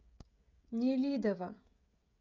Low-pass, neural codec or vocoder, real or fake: 7.2 kHz; codec, 16 kHz, 16 kbps, FreqCodec, smaller model; fake